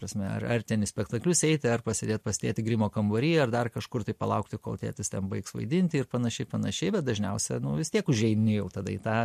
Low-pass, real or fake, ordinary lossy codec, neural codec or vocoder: 14.4 kHz; real; MP3, 64 kbps; none